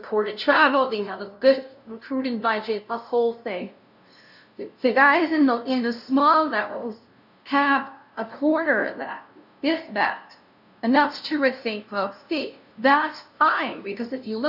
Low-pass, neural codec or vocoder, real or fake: 5.4 kHz; codec, 16 kHz, 0.5 kbps, FunCodec, trained on LibriTTS, 25 frames a second; fake